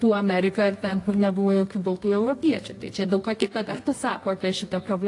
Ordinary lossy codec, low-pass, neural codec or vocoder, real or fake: AAC, 48 kbps; 10.8 kHz; codec, 24 kHz, 0.9 kbps, WavTokenizer, medium music audio release; fake